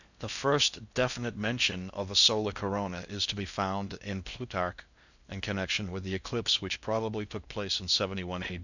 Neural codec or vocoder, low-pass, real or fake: codec, 16 kHz in and 24 kHz out, 0.6 kbps, FocalCodec, streaming, 4096 codes; 7.2 kHz; fake